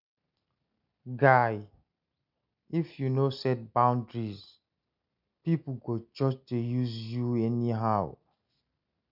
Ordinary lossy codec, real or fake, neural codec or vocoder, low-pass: none; real; none; 5.4 kHz